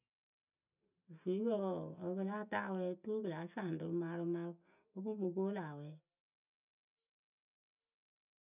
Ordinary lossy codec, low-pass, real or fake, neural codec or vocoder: none; 3.6 kHz; real; none